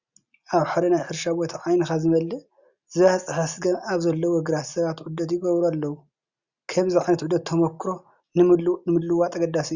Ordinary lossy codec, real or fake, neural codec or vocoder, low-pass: Opus, 64 kbps; real; none; 7.2 kHz